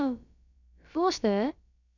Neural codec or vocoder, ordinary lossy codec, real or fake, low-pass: codec, 16 kHz, about 1 kbps, DyCAST, with the encoder's durations; none; fake; 7.2 kHz